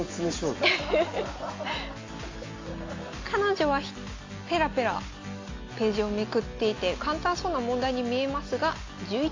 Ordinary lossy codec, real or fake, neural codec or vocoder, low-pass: AAC, 32 kbps; real; none; 7.2 kHz